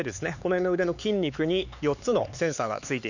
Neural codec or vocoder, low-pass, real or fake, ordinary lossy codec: codec, 16 kHz, 4 kbps, X-Codec, HuBERT features, trained on LibriSpeech; 7.2 kHz; fake; none